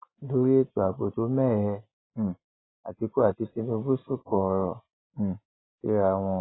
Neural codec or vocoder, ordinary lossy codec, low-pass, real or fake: none; AAC, 16 kbps; 7.2 kHz; real